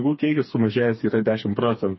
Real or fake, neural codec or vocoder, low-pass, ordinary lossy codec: fake; codec, 16 kHz, 4 kbps, FreqCodec, smaller model; 7.2 kHz; MP3, 24 kbps